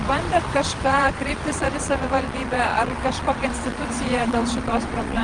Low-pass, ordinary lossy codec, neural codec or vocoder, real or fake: 9.9 kHz; Opus, 24 kbps; vocoder, 22.05 kHz, 80 mel bands, WaveNeXt; fake